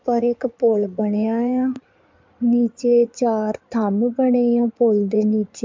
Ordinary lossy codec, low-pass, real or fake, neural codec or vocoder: MP3, 64 kbps; 7.2 kHz; fake; codec, 16 kHz in and 24 kHz out, 2.2 kbps, FireRedTTS-2 codec